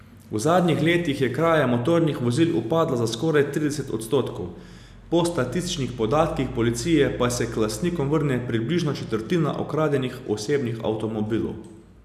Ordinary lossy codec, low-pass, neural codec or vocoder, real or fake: none; 14.4 kHz; none; real